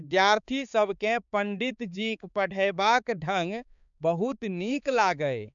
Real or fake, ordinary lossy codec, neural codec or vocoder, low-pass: fake; none; codec, 16 kHz, 4 kbps, X-Codec, HuBERT features, trained on balanced general audio; 7.2 kHz